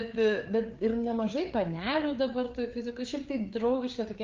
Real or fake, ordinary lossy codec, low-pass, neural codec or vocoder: fake; Opus, 24 kbps; 7.2 kHz; codec, 16 kHz, 4 kbps, FreqCodec, larger model